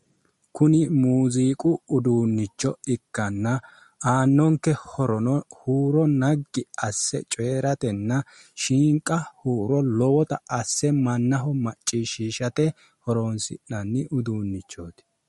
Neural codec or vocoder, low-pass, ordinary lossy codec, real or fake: none; 19.8 kHz; MP3, 48 kbps; real